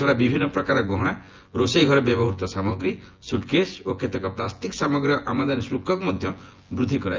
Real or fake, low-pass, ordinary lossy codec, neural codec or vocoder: fake; 7.2 kHz; Opus, 24 kbps; vocoder, 24 kHz, 100 mel bands, Vocos